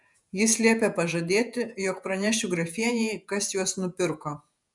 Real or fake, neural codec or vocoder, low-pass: fake; vocoder, 48 kHz, 128 mel bands, Vocos; 10.8 kHz